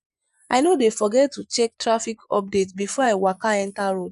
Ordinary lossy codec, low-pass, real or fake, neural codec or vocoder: none; 10.8 kHz; fake; vocoder, 24 kHz, 100 mel bands, Vocos